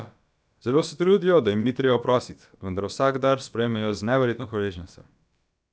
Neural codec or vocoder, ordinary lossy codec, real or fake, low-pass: codec, 16 kHz, about 1 kbps, DyCAST, with the encoder's durations; none; fake; none